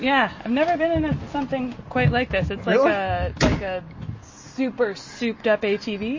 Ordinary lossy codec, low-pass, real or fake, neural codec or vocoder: MP3, 32 kbps; 7.2 kHz; real; none